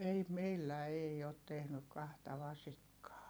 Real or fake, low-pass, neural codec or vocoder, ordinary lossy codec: fake; none; vocoder, 44.1 kHz, 128 mel bands every 256 samples, BigVGAN v2; none